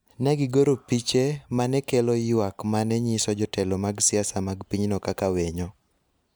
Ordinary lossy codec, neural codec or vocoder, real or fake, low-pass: none; none; real; none